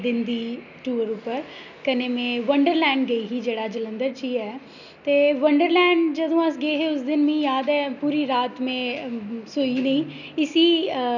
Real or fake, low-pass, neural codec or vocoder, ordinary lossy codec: real; 7.2 kHz; none; none